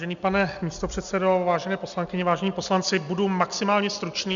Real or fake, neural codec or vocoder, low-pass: real; none; 7.2 kHz